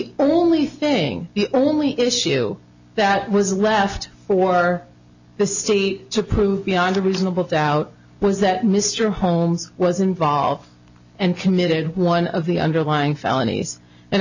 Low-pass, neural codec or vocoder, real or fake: 7.2 kHz; none; real